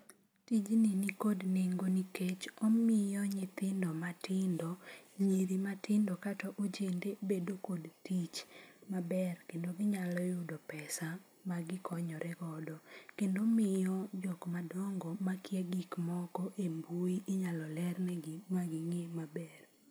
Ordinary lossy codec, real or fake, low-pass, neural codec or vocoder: none; real; none; none